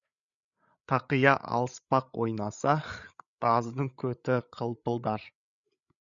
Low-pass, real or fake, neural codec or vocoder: 7.2 kHz; fake; codec, 16 kHz, 8 kbps, FreqCodec, larger model